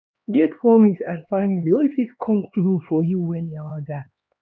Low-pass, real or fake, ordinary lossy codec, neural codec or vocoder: none; fake; none; codec, 16 kHz, 2 kbps, X-Codec, HuBERT features, trained on LibriSpeech